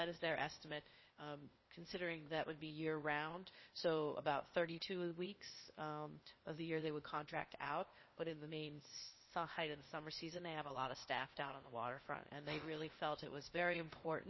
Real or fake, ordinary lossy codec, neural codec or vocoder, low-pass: fake; MP3, 24 kbps; codec, 16 kHz, 0.8 kbps, ZipCodec; 7.2 kHz